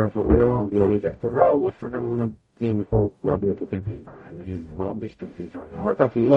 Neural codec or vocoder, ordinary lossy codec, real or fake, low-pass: codec, 44.1 kHz, 0.9 kbps, DAC; MP3, 48 kbps; fake; 19.8 kHz